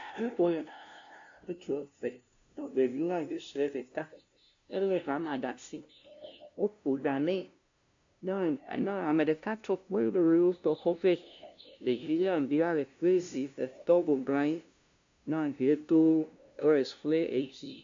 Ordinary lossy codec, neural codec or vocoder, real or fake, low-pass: AAC, 64 kbps; codec, 16 kHz, 0.5 kbps, FunCodec, trained on LibriTTS, 25 frames a second; fake; 7.2 kHz